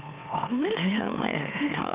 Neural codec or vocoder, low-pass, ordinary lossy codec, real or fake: autoencoder, 44.1 kHz, a latent of 192 numbers a frame, MeloTTS; 3.6 kHz; Opus, 24 kbps; fake